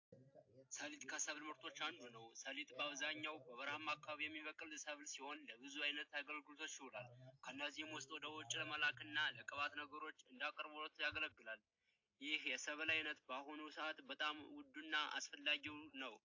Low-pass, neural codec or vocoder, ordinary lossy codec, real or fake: 7.2 kHz; none; AAC, 48 kbps; real